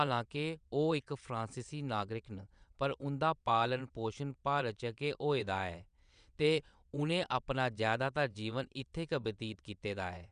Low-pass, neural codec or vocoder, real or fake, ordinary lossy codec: 9.9 kHz; vocoder, 22.05 kHz, 80 mel bands, WaveNeXt; fake; none